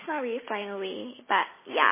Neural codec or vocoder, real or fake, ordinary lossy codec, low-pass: none; real; MP3, 16 kbps; 3.6 kHz